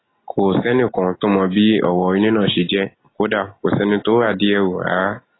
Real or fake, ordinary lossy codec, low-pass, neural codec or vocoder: real; AAC, 16 kbps; 7.2 kHz; none